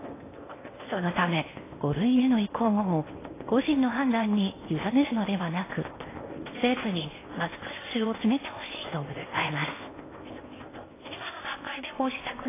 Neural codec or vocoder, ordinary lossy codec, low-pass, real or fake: codec, 16 kHz in and 24 kHz out, 0.8 kbps, FocalCodec, streaming, 65536 codes; AAC, 16 kbps; 3.6 kHz; fake